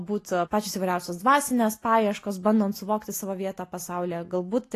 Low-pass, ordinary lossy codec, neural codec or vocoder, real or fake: 14.4 kHz; AAC, 48 kbps; none; real